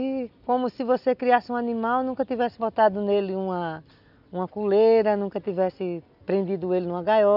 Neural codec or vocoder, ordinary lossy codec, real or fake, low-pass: none; none; real; 5.4 kHz